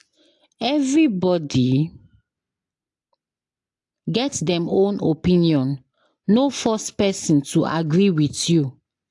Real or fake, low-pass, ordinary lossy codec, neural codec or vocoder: real; 10.8 kHz; AAC, 64 kbps; none